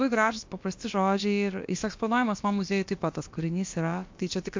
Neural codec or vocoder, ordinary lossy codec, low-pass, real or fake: codec, 16 kHz, about 1 kbps, DyCAST, with the encoder's durations; MP3, 48 kbps; 7.2 kHz; fake